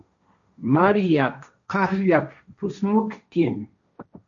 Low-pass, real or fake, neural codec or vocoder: 7.2 kHz; fake; codec, 16 kHz, 1.1 kbps, Voila-Tokenizer